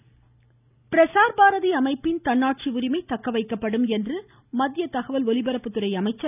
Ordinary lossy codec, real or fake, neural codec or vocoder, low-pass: none; real; none; 3.6 kHz